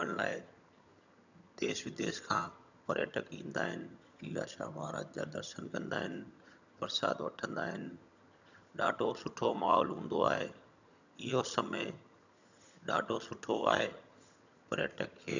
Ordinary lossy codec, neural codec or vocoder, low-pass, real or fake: none; vocoder, 22.05 kHz, 80 mel bands, HiFi-GAN; 7.2 kHz; fake